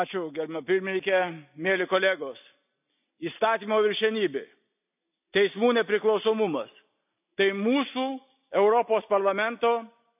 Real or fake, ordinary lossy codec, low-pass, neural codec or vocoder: real; none; 3.6 kHz; none